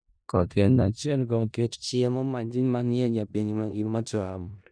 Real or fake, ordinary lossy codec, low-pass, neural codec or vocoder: fake; none; 9.9 kHz; codec, 16 kHz in and 24 kHz out, 0.4 kbps, LongCat-Audio-Codec, four codebook decoder